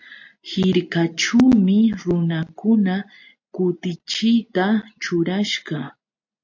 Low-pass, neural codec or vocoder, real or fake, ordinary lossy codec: 7.2 kHz; none; real; AAC, 48 kbps